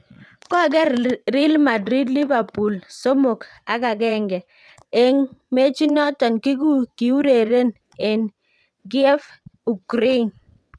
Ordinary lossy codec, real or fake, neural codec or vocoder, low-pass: none; fake; vocoder, 22.05 kHz, 80 mel bands, WaveNeXt; none